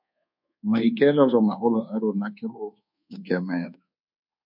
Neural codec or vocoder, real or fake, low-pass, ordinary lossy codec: codec, 24 kHz, 1.2 kbps, DualCodec; fake; 5.4 kHz; MP3, 48 kbps